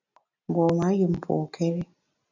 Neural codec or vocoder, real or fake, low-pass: none; real; 7.2 kHz